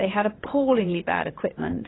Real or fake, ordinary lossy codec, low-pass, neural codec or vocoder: fake; AAC, 16 kbps; 7.2 kHz; vocoder, 22.05 kHz, 80 mel bands, WaveNeXt